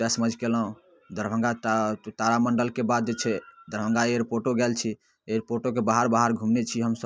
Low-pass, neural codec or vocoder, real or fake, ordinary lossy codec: none; none; real; none